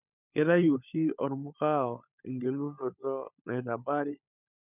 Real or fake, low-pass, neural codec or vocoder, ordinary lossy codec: fake; 3.6 kHz; codec, 16 kHz, 16 kbps, FunCodec, trained on LibriTTS, 50 frames a second; none